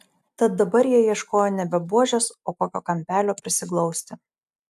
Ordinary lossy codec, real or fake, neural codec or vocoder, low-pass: AAC, 96 kbps; real; none; 14.4 kHz